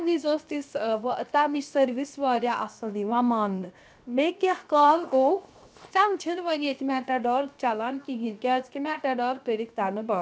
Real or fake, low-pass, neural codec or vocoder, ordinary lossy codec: fake; none; codec, 16 kHz, 0.7 kbps, FocalCodec; none